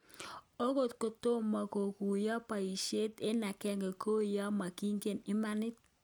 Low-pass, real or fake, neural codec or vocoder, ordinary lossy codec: none; real; none; none